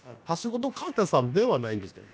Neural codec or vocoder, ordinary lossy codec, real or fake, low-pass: codec, 16 kHz, about 1 kbps, DyCAST, with the encoder's durations; none; fake; none